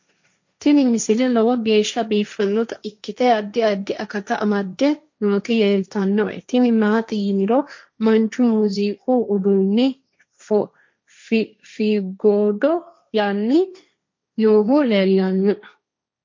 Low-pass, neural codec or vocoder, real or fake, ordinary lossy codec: 7.2 kHz; codec, 16 kHz, 1.1 kbps, Voila-Tokenizer; fake; MP3, 48 kbps